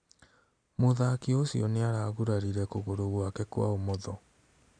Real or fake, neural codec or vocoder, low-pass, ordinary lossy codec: real; none; 9.9 kHz; none